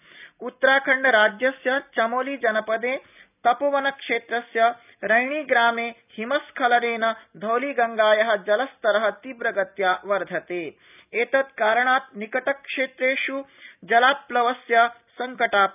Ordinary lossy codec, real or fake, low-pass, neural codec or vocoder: none; real; 3.6 kHz; none